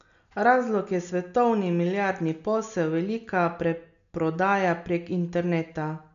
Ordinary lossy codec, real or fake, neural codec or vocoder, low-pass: none; real; none; 7.2 kHz